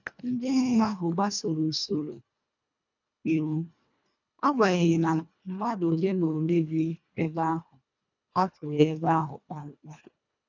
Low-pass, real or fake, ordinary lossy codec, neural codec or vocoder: 7.2 kHz; fake; none; codec, 24 kHz, 1.5 kbps, HILCodec